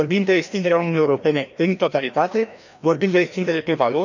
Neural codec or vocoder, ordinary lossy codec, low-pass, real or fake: codec, 16 kHz, 1 kbps, FreqCodec, larger model; none; 7.2 kHz; fake